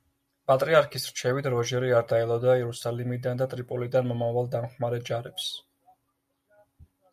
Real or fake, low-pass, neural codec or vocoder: real; 14.4 kHz; none